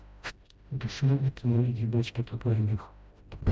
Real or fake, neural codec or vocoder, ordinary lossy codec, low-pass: fake; codec, 16 kHz, 0.5 kbps, FreqCodec, smaller model; none; none